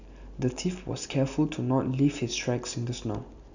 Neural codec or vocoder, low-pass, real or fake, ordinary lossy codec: none; 7.2 kHz; real; none